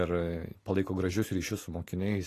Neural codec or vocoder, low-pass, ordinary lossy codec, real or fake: none; 14.4 kHz; AAC, 48 kbps; real